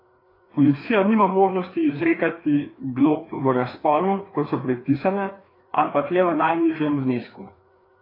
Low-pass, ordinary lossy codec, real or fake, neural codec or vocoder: 5.4 kHz; AAC, 24 kbps; fake; codec, 16 kHz, 2 kbps, FreqCodec, larger model